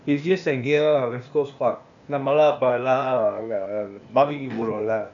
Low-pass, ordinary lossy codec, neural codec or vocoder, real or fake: 7.2 kHz; AAC, 64 kbps; codec, 16 kHz, 0.8 kbps, ZipCodec; fake